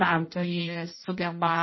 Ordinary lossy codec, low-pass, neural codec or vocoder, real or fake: MP3, 24 kbps; 7.2 kHz; codec, 16 kHz in and 24 kHz out, 0.6 kbps, FireRedTTS-2 codec; fake